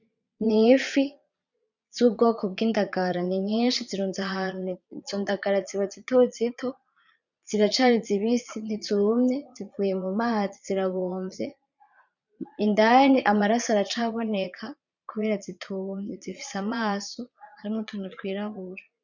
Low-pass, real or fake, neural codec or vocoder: 7.2 kHz; fake; vocoder, 22.05 kHz, 80 mel bands, Vocos